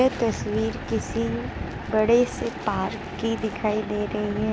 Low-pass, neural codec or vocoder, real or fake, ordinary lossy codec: none; none; real; none